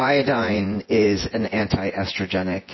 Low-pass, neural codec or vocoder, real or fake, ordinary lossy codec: 7.2 kHz; vocoder, 24 kHz, 100 mel bands, Vocos; fake; MP3, 24 kbps